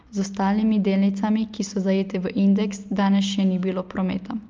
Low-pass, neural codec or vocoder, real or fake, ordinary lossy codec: 7.2 kHz; none; real; Opus, 24 kbps